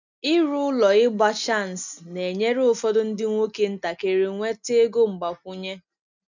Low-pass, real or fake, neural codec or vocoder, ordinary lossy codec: 7.2 kHz; real; none; none